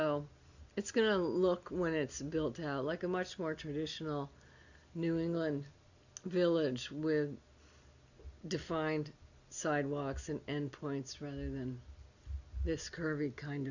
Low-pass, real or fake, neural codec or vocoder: 7.2 kHz; real; none